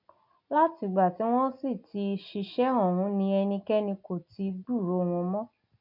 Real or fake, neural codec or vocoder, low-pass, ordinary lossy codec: real; none; 5.4 kHz; none